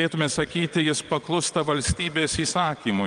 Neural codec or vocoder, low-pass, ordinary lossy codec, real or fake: vocoder, 22.05 kHz, 80 mel bands, WaveNeXt; 9.9 kHz; Opus, 32 kbps; fake